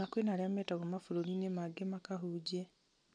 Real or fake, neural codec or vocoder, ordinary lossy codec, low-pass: real; none; none; 9.9 kHz